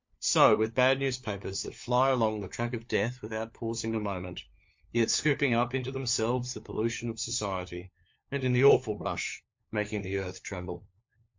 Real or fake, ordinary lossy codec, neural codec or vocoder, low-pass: fake; MP3, 48 kbps; codec, 16 kHz, 4 kbps, FunCodec, trained on LibriTTS, 50 frames a second; 7.2 kHz